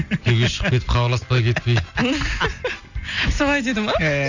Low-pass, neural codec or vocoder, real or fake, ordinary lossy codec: 7.2 kHz; none; real; none